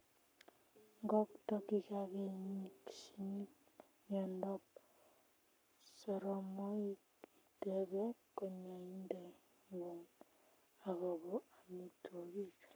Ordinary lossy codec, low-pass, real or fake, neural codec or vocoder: none; none; fake; codec, 44.1 kHz, 7.8 kbps, Pupu-Codec